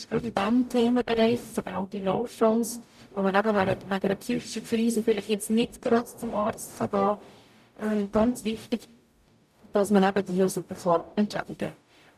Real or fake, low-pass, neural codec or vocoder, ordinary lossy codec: fake; 14.4 kHz; codec, 44.1 kHz, 0.9 kbps, DAC; none